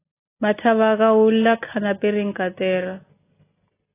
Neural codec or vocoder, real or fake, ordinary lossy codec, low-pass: none; real; AAC, 16 kbps; 3.6 kHz